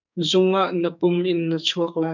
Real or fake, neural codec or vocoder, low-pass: fake; codec, 44.1 kHz, 2.6 kbps, SNAC; 7.2 kHz